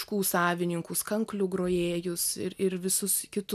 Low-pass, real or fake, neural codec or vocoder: 14.4 kHz; real; none